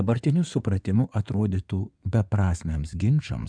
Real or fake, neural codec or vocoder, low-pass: fake; codec, 16 kHz in and 24 kHz out, 2.2 kbps, FireRedTTS-2 codec; 9.9 kHz